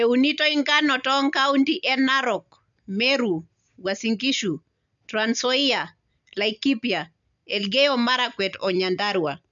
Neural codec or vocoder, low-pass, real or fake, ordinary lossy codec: none; 7.2 kHz; real; none